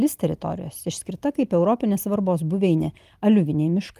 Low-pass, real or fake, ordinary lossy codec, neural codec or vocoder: 14.4 kHz; real; Opus, 32 kbps; none